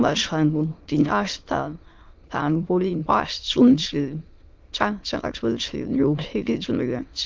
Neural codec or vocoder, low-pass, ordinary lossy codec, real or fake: autoencoder, 22.05 kHz, a latent of 192 numbers a frame, VITS, trained on many speakers; 7.2 kHz; Opus, 32 kbps; fake